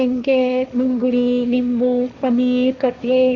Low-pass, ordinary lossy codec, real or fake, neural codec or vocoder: 7.2 kHz; none; fake; codec, 16 kHz, 1.1 kbps, Voila-Tokenizer